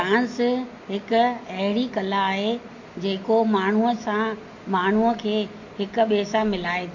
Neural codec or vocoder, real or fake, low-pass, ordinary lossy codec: none; real; 7.2 kHz; MP3, 48 kbps